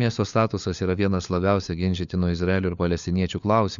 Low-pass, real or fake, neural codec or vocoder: 7.2 kHz; fake; codec, 16 kHz, 4 kbps, FunCodec, trained on LibriTTS, 50 frames a second